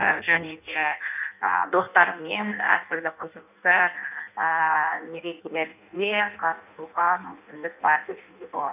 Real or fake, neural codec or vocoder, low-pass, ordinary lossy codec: fake; codec, 16 kHz in and 24 kHz out, 0.6 kbps, FireRedTTS-2 codec; 3.6 kHz; none